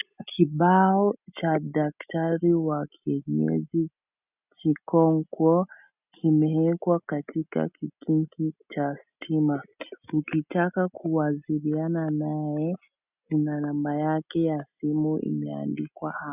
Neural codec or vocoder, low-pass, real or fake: none; 3.6 kHz; real